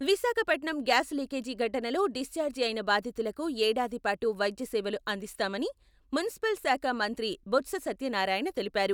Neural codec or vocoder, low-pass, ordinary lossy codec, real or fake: none; 19.8 kHz; none; real